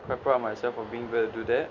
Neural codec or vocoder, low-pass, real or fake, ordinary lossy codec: none; 7.2 kHz; real; none